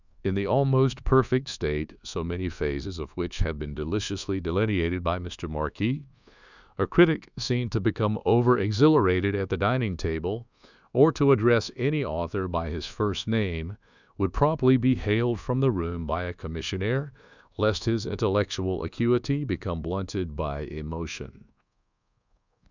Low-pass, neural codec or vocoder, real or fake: 7.2 kHz; codec, 24 kHz, 1.2 kbps, DualCodec; fake